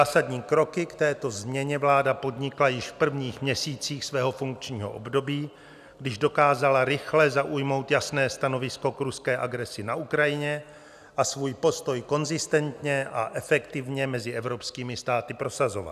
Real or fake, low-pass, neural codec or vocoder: real; 14.4 kHz; none